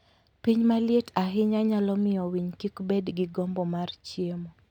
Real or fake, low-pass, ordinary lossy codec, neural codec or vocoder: real; 19.8 kHz; none; none